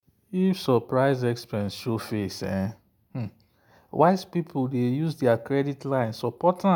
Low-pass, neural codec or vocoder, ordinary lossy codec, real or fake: none; none; none; real